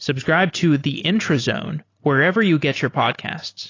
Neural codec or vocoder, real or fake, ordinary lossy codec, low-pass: none; real; AAC, 32 kbps; 7.2 kHz